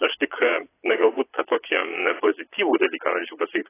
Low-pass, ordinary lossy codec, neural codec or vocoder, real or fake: 3.6 kHz; AAC, 16 kbps; vocoder, 22.05 kHz, 80 mel bands, Vocos; fake